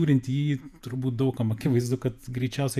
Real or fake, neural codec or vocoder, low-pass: fake; vocoder, 48 kHz, 128 mel bands, Vocos; 14.4 kHz